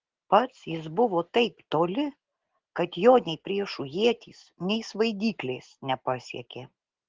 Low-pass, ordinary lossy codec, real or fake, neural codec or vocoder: 7.2 kHz; Opus, 16 kbps; real; none